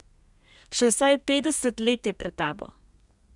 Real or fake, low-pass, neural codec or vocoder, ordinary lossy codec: fake; 10.8 kHz; codec, 32 kHz, 1.9 kbps, SNAC; none